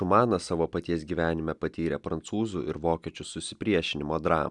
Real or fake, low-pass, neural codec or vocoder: real; 10.8 kHz; none